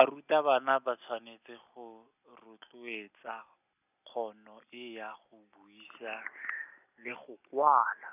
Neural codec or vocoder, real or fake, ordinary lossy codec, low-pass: none; real; none; 3.6 kHz